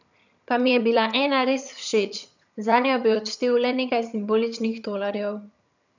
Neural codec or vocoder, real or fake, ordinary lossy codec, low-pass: vocoder, 22.05 kHz, 80 mel bands, HiFi-GAN; fake; none; 7.2 kHz